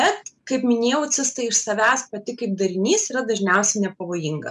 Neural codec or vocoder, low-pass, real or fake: none; 10.8 kHz; real